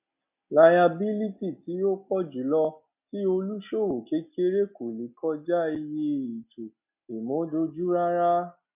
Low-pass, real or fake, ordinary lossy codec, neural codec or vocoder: 3.6 kHz; real; none; none